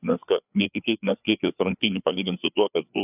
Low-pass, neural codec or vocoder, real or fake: 3.6 kHz; codec, 16 kHz, 4 kbps, FreqCodec, larger model; fake